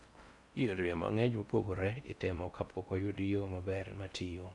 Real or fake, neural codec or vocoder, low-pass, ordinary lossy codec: fake; codec, 16 kHz in and 24 kHz out, 0.6 kbps, FocalCodec, streaming, 4096 codes; 10.8 kHz; none